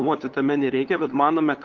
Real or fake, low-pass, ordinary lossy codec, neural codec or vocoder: fake; 7.2 kHz; Opus, 16 kbps; codec, 16 kHz, 8 kbps, FunCodec, trained on LibriTTS, 25 frames a second